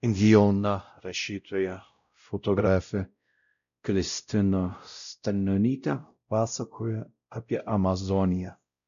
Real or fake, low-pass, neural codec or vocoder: fake; 7.2 kHz; codec, 16 kHz, 0.5 kbps, X-Codec, WavLM features, trained on Multilingual LibriSpeech